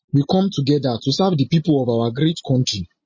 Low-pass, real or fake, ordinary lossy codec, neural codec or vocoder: 7.2 kHz; real; MP3, 32 kbps; none